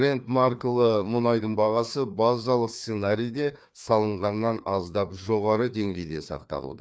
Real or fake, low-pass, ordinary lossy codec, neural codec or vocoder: fake; none; none; codec, 16 kHz, 2 kbps, FreqCodec, larger model